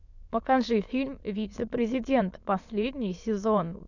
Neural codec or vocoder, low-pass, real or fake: autoencoder, 22.05 kHz, a latent of 192 numbers a frame, VITS, trained on many speakers; 7.2 kHz; fake